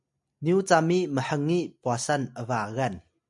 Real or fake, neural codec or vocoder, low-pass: real; none; 10.8 kHz